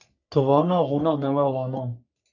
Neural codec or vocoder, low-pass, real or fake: codec, 44.1 kHz, 3.4 kbps, Pupu-Codec; 7.2 kHz; fake